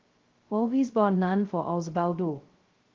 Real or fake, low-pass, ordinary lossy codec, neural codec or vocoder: fake; 7.2 kHz; Opus, 16 kbps; codec, 16 kHz, 0.2 kbps, FocalCodec